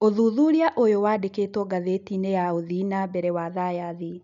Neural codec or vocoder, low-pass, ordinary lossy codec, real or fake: none; 7.2 kHz; none; real